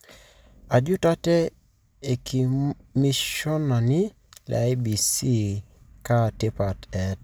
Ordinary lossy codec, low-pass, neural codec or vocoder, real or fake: none; none; none; real